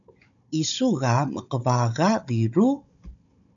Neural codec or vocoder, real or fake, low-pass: codec, 16 kHz, 16 kbps, FunCodec, trained on Chinese and English, 50 frames a second; fake; 7.2 kHz